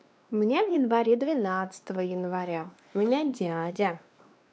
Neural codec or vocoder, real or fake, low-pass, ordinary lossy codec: codec, 16 kHz, 2 kbps, X-Codec, WavLM features, trained on Multilingual LibriSpeech; fake; none; none